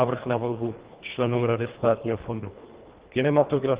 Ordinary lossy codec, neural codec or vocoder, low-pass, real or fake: Opus, 16 kbps; codec, 24 kHz, 1.5 kbps, HILCodec; 3.6 kHz; fake